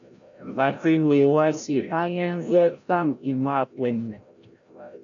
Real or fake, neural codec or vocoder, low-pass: fake; codec, 16 kHz, 0.5 kbps, FreqCodec, larger model; 7.2 kHz